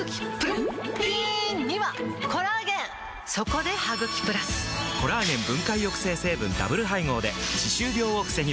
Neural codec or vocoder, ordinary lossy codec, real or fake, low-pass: none; none; real; none